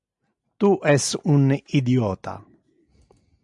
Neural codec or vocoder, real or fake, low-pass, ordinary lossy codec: none; real; 10.8 kHz; MP3, 96 kbps